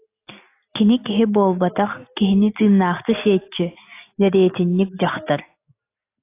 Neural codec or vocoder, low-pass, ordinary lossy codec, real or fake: none; 3.6 kHz; AAC, 24 kbps; real